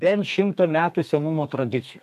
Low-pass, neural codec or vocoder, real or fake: 14.4 kHz; codec, 32 kHz, 1.9 kbps, SNAC; fake